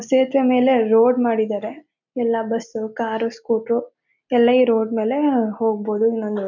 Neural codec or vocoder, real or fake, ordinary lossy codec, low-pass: none; real; MP3, 64 kbps; 7.2 kHz